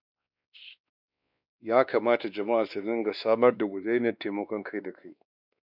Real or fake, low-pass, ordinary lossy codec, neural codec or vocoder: fake; 5.4 kHz; none; codec, 16 kHz, 2 kbps, X-Codec, WavLM features, trained on Multilingual LibriSpeech